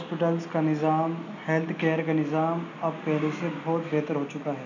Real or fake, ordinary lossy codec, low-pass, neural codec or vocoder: real; none; 7.2 kHz; none